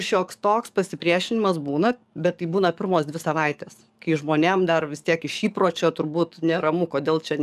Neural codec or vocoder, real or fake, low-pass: codec, 44.1 kHz, 7.8 kbps, DAC; fake; 14.4 kHz